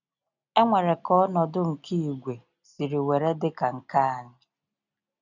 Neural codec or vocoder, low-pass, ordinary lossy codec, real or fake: none; 7.2 kHz; none; real